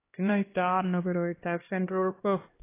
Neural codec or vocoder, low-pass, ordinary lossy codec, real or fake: codec, 16 kHz, 1 kbps, X-Codec, HuBERT features, trained on LibriSpeech; 3.6 kHz; AAC, 24 kbps; fake